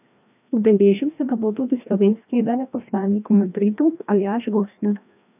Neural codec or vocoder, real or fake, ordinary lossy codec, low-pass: codec, 16 kHz, 1 kbps, FreqCodec, larger model; fake; none; 3.6 kHz